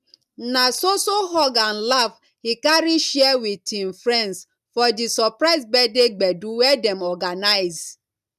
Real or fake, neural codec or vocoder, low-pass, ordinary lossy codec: real; none; 14.4 kHz; none